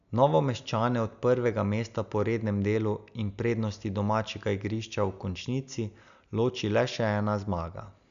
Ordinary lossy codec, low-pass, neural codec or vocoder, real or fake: none; 7.2 kHz; none; real